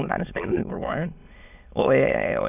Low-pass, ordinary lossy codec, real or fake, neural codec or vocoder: 3.6 kHz; none; fake; autoencoder, 22.05 kHz, a latent of 192 numbers a frame, VITS, trained on many speakers